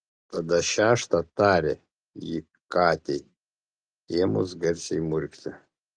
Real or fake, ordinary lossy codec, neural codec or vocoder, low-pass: real; Opus, 32 kbps; none; 9.9 kHz